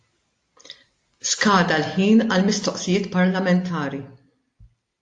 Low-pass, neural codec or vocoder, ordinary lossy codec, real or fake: 9.9 kHz; none; AAC, 48 kbps; real